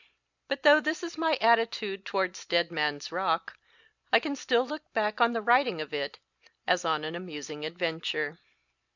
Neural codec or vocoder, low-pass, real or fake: none; 7.2 kHz; real